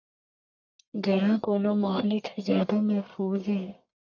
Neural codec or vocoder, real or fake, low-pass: codec, 44.1 kHz, 1.7 kbps, Pupu-Codec; fake; 7.2 kHz